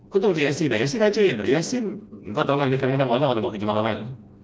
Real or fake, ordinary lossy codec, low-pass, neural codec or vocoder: fake; none; none; codec, 16 kHz, 1 kbps, FreqCodec, smaller model